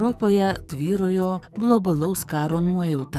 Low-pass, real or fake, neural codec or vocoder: 14.4 kHz; fake; codec, 44.1 kHz, 2.6 kbps, SNAC